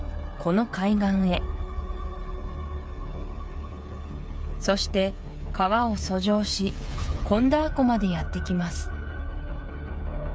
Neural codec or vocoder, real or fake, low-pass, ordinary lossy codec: codec, 16 kHz, 8 kbps, FreqCodec, smaller model; fake; none; none